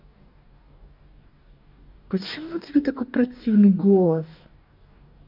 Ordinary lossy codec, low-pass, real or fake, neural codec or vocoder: MP3, 48 kbps; 5.4 kHz; fake; codec, 44.1 kHz, 2.6 kbps, DAC